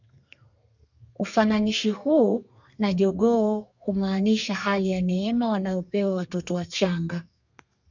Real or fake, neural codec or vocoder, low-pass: fake; codec, 32 kHz, 1.9 kbps, SNAC; 7.2 kHz